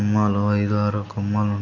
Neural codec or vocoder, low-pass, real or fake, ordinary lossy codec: none; 7.2 kHz; real; none